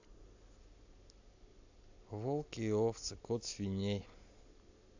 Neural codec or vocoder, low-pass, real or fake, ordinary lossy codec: none; 7.2 kHz; real; AAC, 48 kbps